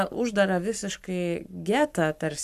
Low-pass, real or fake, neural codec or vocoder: 14.4 kHz; fake; codec, 44.1 kHz, 7.8 kbps, Pupu-Codec